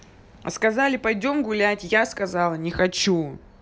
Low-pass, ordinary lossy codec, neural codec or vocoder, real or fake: none; none; none; real